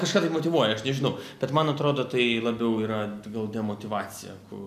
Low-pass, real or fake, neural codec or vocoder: 14.4 kHz; real; none